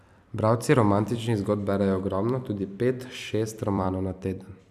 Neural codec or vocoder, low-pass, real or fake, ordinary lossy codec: vocoder, 44.1 kHz, 128 mel bands every 256 samples, BigVGAN v2; 14.4 kHz; fake; none